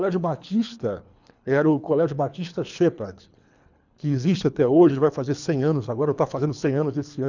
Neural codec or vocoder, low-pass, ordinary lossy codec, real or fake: codec, 24 kHz, 3 kbps, HILCodec; 7.2 kHz; none; fake